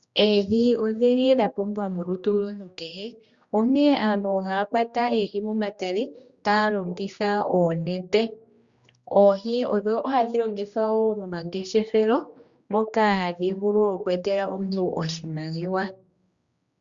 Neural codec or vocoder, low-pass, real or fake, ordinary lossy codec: codec, 16 kHz, 1 kbps, X-Codec, HuBERT features, trained on general audio; 7.2 kHz; fake; Opus, 64 kbps